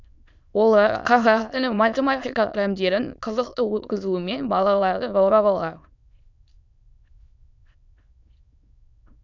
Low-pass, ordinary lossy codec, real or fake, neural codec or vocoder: 7.2 kHz; none; fake; autoencoder, 22.05 kHz, a latent of 192 numbers a frame, VITS, trained on many speakers